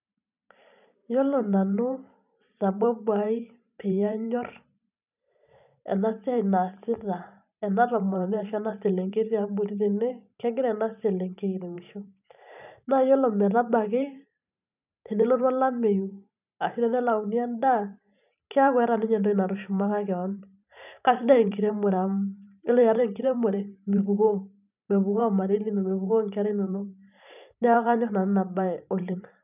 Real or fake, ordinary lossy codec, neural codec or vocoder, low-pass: fake; none; vocoder, 44.1 kHz, 128 mel bands every 512 samples, BigVGAN v2; 3.6 kHz